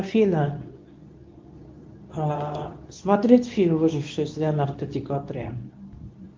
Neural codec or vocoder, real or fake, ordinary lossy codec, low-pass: codec, 24 kHz, 0.9 kbps, WavTokenizer, medium speech release version 2; fake; Opus, 32 kbps; 7.2 kHz